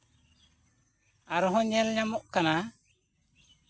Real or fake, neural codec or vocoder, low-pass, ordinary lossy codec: real; none; none; none